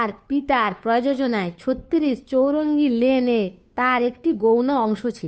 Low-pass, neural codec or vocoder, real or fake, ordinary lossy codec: none; codec, 16 kHz, 2 kbps, FunCodec, trained on Chinese and English, 25 frames a second; fake; none